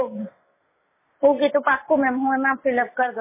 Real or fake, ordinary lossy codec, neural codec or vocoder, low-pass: real; MP3, 16 kbps; none; 3.6 kHz